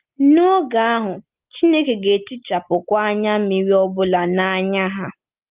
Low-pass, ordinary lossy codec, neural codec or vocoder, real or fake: 3.6 kHz; Opus, 32 kbps; none; real